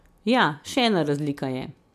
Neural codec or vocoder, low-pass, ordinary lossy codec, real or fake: none; 14.4 kHz; MP3, 96 kbps; real